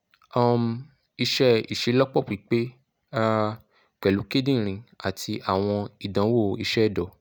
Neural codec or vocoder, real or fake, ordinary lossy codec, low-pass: none; real; none; none